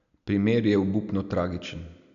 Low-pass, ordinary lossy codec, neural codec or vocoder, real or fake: 7.2 kHz; none; none; real